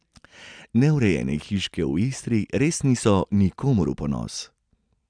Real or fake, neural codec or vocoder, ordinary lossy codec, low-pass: real; none; none; 9.9 kHz